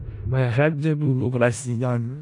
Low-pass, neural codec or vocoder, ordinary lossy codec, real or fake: 10.8 kHz; codec, 16 kHz in and 24 kHz out, 0.4 kbps, LongCat-Audio-Codec, four codebook decoder; AAC, 64 kbps; fake